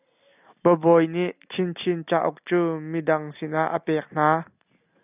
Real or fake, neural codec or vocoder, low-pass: real; none; 3.6 kHz